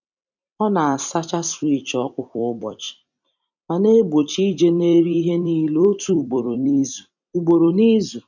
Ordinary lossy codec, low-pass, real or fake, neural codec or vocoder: none; 7.2 kHz; fake; vocoder, 44.1 kHz, 128 mel bands every 256 samples, BigVGAN v2